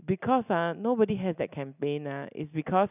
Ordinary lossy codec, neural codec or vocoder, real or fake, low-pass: none; none; real; 3.6 kHz